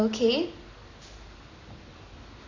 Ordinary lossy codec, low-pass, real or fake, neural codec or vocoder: AAC, 32 kbps; 7.2 kHz; real; none